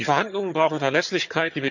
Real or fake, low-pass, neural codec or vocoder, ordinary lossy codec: fake; 7.2 kHz; vocoder, 22.05 kHz, 80 mel bands, HiFi-GAN; none